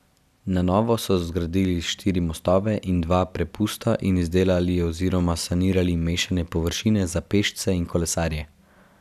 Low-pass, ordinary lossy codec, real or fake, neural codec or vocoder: 14.4 kHz; none; real; none